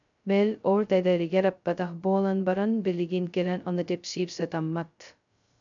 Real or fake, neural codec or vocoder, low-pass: fake; codec, 16 kHz, 0.2 kbps, FocalCodec; 7.2 kHz